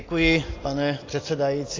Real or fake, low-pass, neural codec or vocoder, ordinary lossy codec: real; 7.2 kHz; none; AAC, 32 kbps